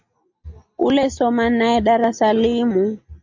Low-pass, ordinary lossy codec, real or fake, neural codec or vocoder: 7.2 kHz; MP3, 48 kbps; real; none